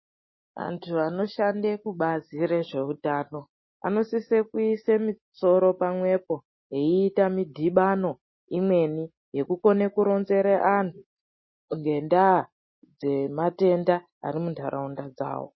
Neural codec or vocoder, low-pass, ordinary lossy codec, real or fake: none; 7.2 kHz; MP3, 24 kbps; real